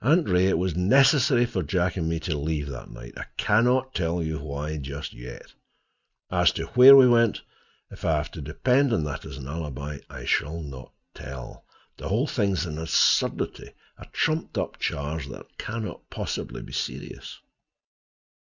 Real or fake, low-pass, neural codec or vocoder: real; 7.2 kHz; none